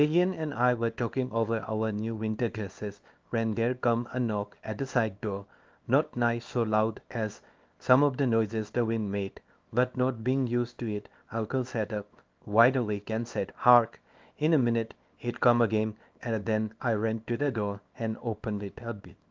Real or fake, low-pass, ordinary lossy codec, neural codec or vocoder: fake; 7.2 kHz; Opus, 32 kbps; codec, 24 kHz, 0.9 kbps, WavTokenizer, small release